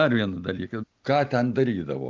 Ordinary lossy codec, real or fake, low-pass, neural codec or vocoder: Opus, 24 kbps; real; 7.2 kHz; none